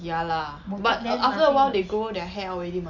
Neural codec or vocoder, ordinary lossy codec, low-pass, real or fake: none; none; 7.2 kHz; real